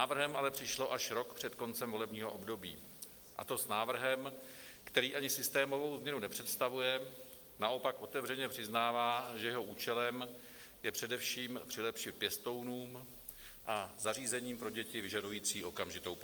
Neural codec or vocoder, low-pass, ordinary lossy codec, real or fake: none; 19.8 kHz; Opus, 32 kbps; real